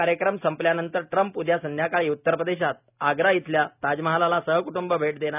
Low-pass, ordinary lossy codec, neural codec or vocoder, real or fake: 3.6 kHz; none; none; real